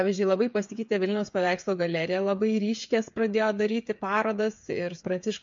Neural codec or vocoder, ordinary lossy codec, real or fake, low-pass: codec, 16 kHz, 8 kbps, FreqCodec, smaller model; MP3, 48 kbps; fake; 7.2 kHz